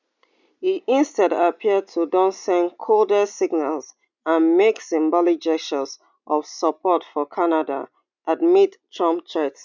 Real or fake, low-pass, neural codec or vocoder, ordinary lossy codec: real; 7.2 kHz; none; none